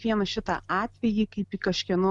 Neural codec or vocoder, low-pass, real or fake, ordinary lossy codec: none; 10.8 kHz; real; MP3, 96 kbps